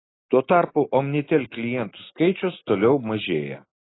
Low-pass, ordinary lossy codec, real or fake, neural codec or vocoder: 7.2 kHz; AAC, 16 kbps; real; none